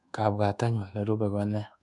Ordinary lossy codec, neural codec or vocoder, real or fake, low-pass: none; codec, 24 kHz, 1.2 kbps, DualCodec; fake; 10.8 kHz